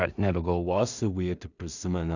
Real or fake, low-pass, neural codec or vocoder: fake; 7.2 kHz; codec, 16 kHz in and 24 kHz out, 0.4 kbps, LongCat-Audio-Codec, two codebook decoder